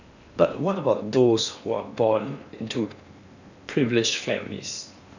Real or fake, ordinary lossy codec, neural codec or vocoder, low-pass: fake; none; codec, 16 kHz in and 24 kHz out, 0.8 kbps, FocalCodec, streaming, 65536 codes; 7.2 kHz